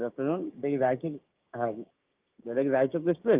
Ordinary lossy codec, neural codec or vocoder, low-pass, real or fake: Opus, 32 kbps; codec, 16 kHz, 6 kbps, DAC; 3.6 kHz; fake